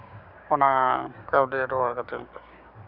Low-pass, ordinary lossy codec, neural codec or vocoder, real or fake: 5.4 kHz; none; codec, 16 kHz, 6 kbps, DAC; fake